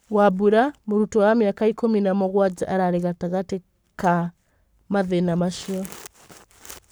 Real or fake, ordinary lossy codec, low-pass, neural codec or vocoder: fake; none; none; codec, 44.1 kHz, 7.8 kbps, Pupu-Codec